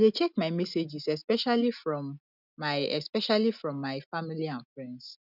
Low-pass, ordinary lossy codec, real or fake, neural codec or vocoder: 5.4 kHz; none; real; none